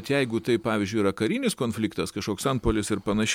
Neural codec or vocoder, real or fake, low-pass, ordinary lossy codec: none; real; 19.8 kHz; MP3, 96 kbps